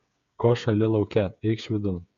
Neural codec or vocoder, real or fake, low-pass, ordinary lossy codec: codec, 16 kHz, 8 kbps, FreqCodec, smaller model; fake; 7.2 kHz; MP3, 96 kbps